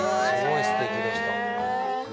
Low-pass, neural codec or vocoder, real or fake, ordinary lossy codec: none; none; real; none